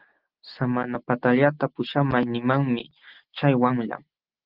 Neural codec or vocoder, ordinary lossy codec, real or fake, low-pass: none; Opus, 16 kbps; real; 5.4 kHz